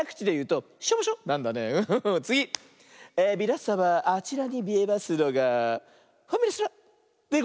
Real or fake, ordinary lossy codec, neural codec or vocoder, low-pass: real; none; none; none